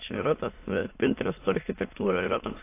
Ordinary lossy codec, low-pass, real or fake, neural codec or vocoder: AAC, 24 kbps; 3.6 kHz; fake; autoencoder, 22.05 kHz, a latent of 192 numbers a frame, VITS, trained on many speakers